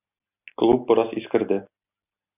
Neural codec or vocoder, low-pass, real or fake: none; 3.6 kHz; real